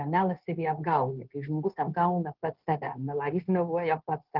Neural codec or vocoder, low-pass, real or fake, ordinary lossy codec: codec, 16 kHz, 0.9 kbps, LongCat-Audio-Codec; 5.4 kHz; fake; Opus, 16 kbps